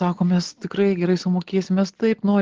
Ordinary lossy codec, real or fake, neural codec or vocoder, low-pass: Opus, 16 kbps; real; none; 7.2 kHz